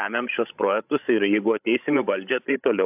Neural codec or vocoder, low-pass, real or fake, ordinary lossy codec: codec, 16 kHz, 16 kbps, FreqCodec, larger model; 3.6 kHz; fake; AAC, 32 kbps